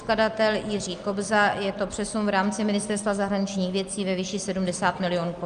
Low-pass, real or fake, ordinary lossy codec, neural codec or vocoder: 9.9 kHz; real; Opus, 32 kbps; none